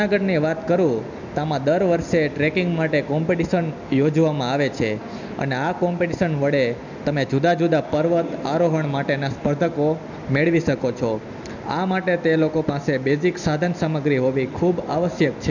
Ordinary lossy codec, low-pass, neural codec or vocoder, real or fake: none; 7.2 kHz; none; real